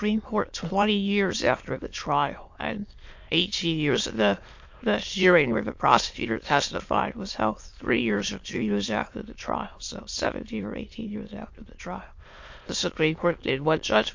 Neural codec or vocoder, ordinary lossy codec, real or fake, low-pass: autoencoder, 22.05 kHz, a latent of 192 numbers a frame, VITS, trained on many speakers; MP3, 48 kbps; fake; 7.2 kHz